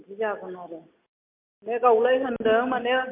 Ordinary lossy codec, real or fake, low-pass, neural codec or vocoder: MP3, 32 kbps; real; 3.6 kHz; none